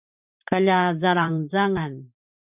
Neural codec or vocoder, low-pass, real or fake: vocoder, 44.1 kHz, 128 mel bands every 512 samples, BigVGAN v2; 3.6 kHz; fake